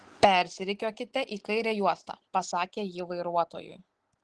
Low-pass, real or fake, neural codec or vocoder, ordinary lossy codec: 10.8 kHz; real; none; Opus, 16 kbps